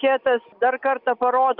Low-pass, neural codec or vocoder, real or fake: 5.4 kHz; none; real